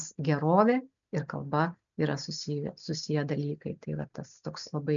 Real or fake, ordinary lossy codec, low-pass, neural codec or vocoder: real; MP3, 96 kbps; 7.2 kHz; none